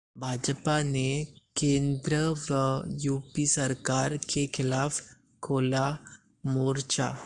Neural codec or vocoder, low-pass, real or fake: codec, 44.1 kHz, 7.8 kbps, Pupu-Codec; 10.8 kHz; fake